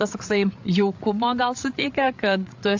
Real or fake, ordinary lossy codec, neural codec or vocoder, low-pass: fake; AAC, 48 kbps; codec, 16 kHz, 8 kbps, FreqCodec, larger model; 7.2 kHz